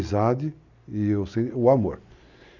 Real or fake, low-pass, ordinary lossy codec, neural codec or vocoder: real; 7.2 kHz; none; none